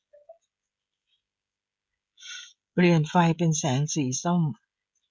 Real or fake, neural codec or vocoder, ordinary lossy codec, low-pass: fake; codec, 16 kHz, 16 kbps, FreqCodec, smaller model; none; none